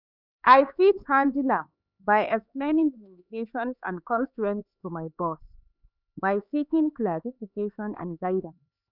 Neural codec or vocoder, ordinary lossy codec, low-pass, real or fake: codec, 16 kHz, 4 kbps, X-Codec, HuBERT features, trained on LibriSpeech; none; 5.4 kHz; fake